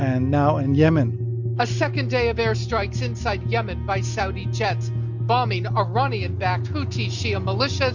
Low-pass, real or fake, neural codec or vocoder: 7.2 kHz; real; none